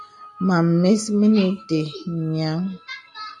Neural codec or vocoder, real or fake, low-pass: none; real; 10.8 kHz